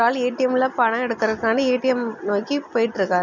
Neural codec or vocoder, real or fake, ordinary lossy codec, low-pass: none; real; none; 7.2 kHz